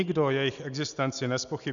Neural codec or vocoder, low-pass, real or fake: none; 7.2 kHz; real